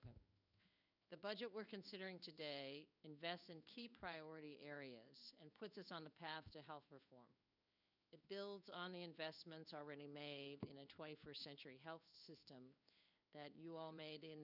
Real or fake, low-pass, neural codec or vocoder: fake; 5.4 kHz; codec, 16 kHz in and 24 kHz out, 1 kbps, XY-Tokenizer